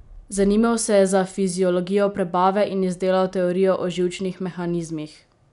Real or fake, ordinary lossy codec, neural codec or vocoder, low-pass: real; none; none; 10.8 kHz